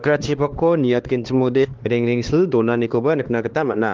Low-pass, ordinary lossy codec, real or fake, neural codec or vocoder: 7.2 kHz; Opus, 16 kbps; fake; codec, 16 kHz, 2 kbps, X-Codec, HuBERT features, trained on LibriSpeech